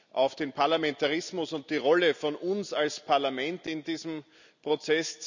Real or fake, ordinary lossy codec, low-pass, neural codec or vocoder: real; none; 7.2 kHz; none